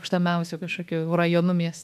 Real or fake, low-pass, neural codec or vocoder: fake; 14.4 kHz; autoencoder, 48 kHz, 32 numbers a frame, DAC-VAE, trained on Japanese speech